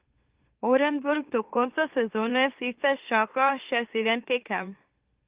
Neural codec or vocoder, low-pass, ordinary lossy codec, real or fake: autoencoder, 44.1 kHz, a latent of 192 numbers a frame, MeloTTS; 3.6 kHz; Opus, 32 kbps; fake